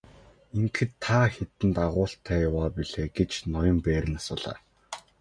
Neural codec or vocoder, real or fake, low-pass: none; real; 9.9 kHz